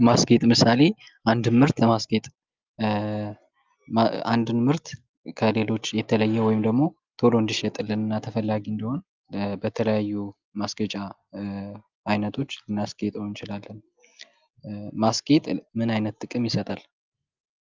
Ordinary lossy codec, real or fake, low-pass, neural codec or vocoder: Opus, 32 kbps; real; 7.2 kHz; none